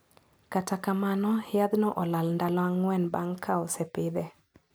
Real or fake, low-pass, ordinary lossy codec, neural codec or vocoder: real; none; none; none